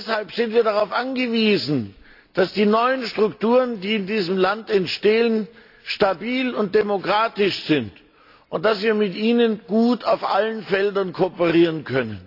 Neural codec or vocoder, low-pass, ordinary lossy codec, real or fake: none; 5.4 kHz; AAC, 32 kbps; real